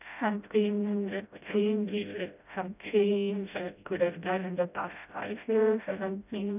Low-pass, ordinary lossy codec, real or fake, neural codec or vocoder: 3.6 kHz; none; fake; codec, 16 kHz, 0.5 kbps, FreqCodec, smaller model